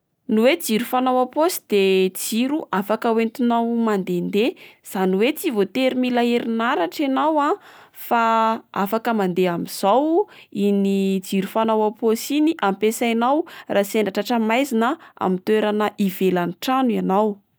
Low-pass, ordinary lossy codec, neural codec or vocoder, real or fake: none; none; none; real